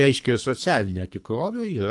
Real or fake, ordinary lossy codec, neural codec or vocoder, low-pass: fake; AAC, 64 kbps; codec, 24 kHz, 3 kbps, HILCodec; 10.8 kHz